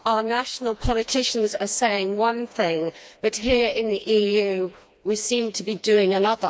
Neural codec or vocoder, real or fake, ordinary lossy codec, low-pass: codec, 16 kHz, 2 kbps, FreqCodec, smaller model; fake; none; none